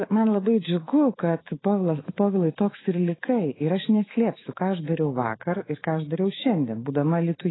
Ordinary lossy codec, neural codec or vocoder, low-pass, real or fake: AAC, 16 kbps; autoencoder, 48 kHz, 128 numbers a frame, DAC-VAE, trained on Japanese speech; 7.2 kHz; fake